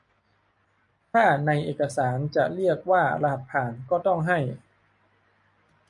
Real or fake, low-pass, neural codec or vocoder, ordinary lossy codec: real; 10.8 kHz; none; MP3, 96 kbps